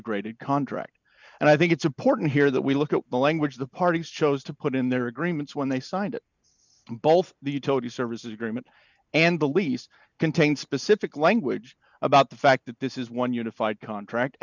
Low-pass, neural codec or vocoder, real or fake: 7.2 kHz; none; real